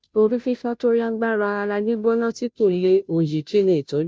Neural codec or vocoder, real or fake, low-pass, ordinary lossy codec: codec, 16 kHz, 0.5 kbps, FunCodec, trained on Chinese and English, 25 frames a second; fake; none; none